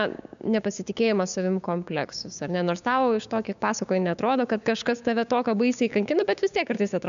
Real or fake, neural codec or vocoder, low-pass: fake; codec, 16 kHz, 6 kbps, DAC; 7.2 kHz